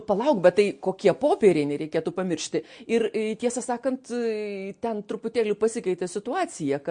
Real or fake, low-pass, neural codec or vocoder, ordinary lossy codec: real; 9.9 kHz; none; MP3, 48 kbps